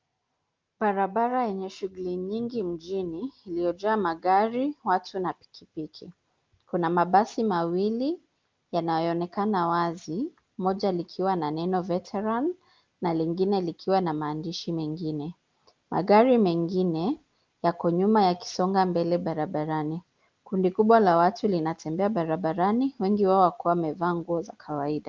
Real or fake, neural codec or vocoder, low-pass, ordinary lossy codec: real; none; 7.2 kHz; Opus, 32 kbps